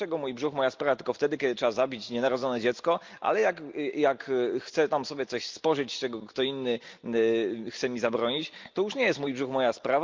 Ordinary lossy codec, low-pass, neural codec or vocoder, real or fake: Opus, 24 kbps; 7.2 kHz; none; real